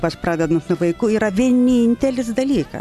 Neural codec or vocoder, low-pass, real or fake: none; 14.4 kHz; real